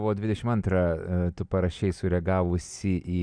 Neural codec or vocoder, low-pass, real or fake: none; 9.9 kHz; real